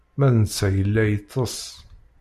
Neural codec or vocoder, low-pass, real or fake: none; 14.4 kHz; real